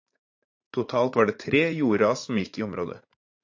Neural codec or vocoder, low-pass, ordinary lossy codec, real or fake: vocoder, 44.1 kHz, 80 mel bands, Vocos; 7.2 kHz; AAC, 48 kbps; fake